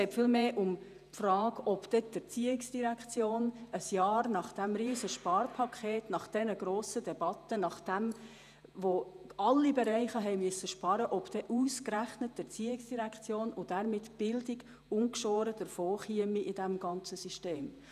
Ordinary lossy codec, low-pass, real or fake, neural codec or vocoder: none; 14.4 kHz; fake; vocoder, 48 kHz, 128 mel bands, Vocos